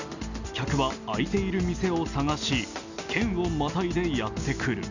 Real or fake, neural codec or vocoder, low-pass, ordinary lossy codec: real; none; 7.2 kHz; none